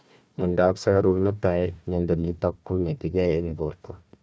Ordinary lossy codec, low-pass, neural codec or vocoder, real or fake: none; none; codec, 16 kHz, 1 kbps, FunCodec, trained on Chinese and English, 50 frames a second; fake